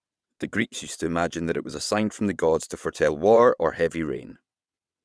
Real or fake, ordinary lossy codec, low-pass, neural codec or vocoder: fake; none; none; vocoder, 22.05 kHz, 80 mel bands, WaveNeXt